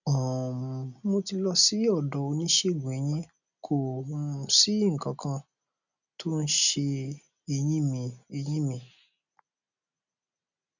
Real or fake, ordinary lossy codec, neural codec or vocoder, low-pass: real; none; none; 7.2 kHz